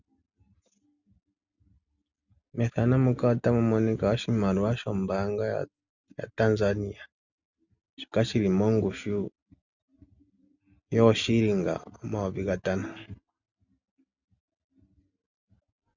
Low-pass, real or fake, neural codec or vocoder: 7.2 kHz; real; none